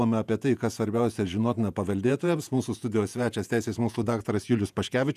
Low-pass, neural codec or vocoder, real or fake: 14.4 kHz; vocoder, 48 kHz, 128 mel bands, Vocos; fake